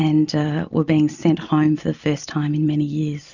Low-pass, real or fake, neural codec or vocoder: 7.2 kHz; real; none